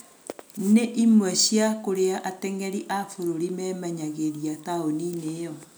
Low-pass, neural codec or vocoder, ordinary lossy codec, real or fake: none; none; none; real